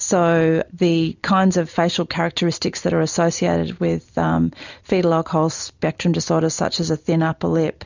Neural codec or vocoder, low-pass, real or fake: none; 7.2 kHz; real